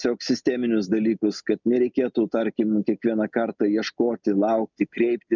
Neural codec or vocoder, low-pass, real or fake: none; 7.2 kHz; real